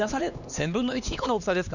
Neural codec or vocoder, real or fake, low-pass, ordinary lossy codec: codec, 16 kHz, 2 kbps, X-Codec, HuBERT features, trained on LibriSpeech; fake; 7.2 kHz; none